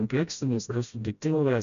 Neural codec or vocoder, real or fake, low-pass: codec, 16 kHz, 0.5 kbps, FreqCodec, smaller model; fake; 7.2 kHz